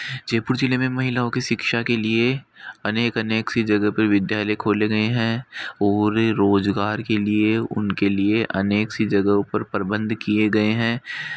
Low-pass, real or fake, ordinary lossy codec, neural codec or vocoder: none; real; none; none